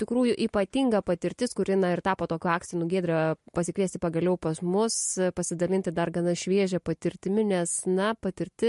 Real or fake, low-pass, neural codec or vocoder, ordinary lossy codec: real; 14.4 kHz; none; MP3, 48 kbps